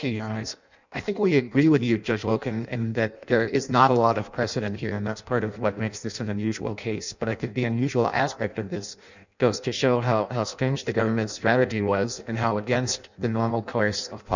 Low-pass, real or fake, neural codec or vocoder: 7.2 kHz; fake; codec, 16 kHz in and 24 kHz out, 0.6 kbps, FireRedTTS-2 codec